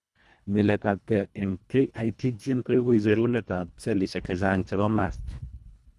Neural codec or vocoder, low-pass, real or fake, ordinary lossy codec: codec, 24 kHz, 1.5 kbps, HILCodec; none; fake; none